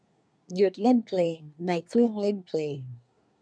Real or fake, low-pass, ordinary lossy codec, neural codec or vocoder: fake; 9.9 kHz; none; codec, 24 kHz, 1 kbps, SNAC